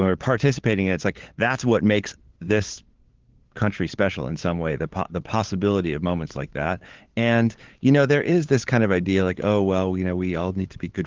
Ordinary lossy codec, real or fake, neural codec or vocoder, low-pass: Opus, 16 kbps; fake; codec, 16 kHz, 8 kbps, FunCodec, trained on LibriTTS, 25 frames a second; 7.2 kHz